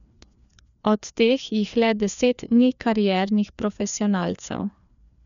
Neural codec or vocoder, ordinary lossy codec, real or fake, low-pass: codec, 16 kHz, 2 kbps, FreqCodec, larger model; none; fake; 7.2 kHz